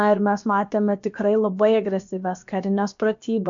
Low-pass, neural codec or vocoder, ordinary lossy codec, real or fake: 7.2 kHz; codec, 16 kHz, 0.7 kbps, FocalCodec; MP3, 64 kbps; fake